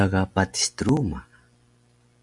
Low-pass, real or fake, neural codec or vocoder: 10.8 kHz; real; none